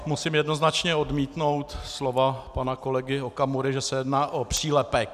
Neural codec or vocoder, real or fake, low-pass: none; real; 14.4 kHz